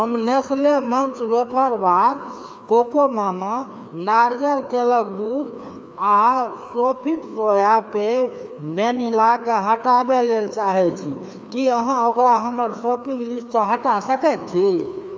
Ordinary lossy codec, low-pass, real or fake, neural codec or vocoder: none; none; fake; codec, 16 kHz, 2 kbps, FreqCodec, larger model